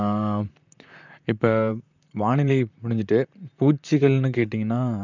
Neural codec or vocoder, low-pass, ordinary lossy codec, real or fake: vocoder, 44.1 kHz, 128 mel bands, Pupu-Vocoder; 7.2 kHz; none; fake